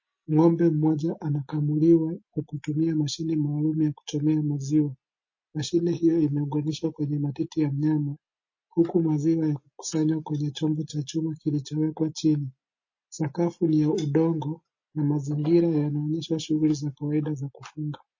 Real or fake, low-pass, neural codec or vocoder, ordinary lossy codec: real; 7.2 kHz; none; MP3, 32 kbps